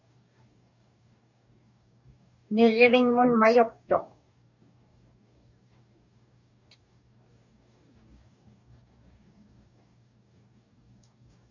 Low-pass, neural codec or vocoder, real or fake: 7.2 kHz; codec, 44.1 kHz, 2.6 kbps, DAC; fake